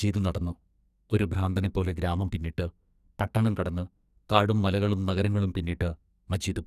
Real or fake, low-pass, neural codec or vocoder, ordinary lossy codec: fake; 14.4 kHz; codec, 44.1 kHz, 2.6 kbps, SNAC; none